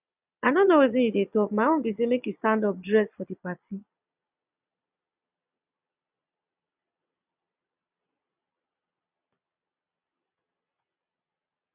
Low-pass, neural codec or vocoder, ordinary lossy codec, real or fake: 3.6 kHz; vocoder, 22.05 kHz, 80 mel bands, Vocos; none; fake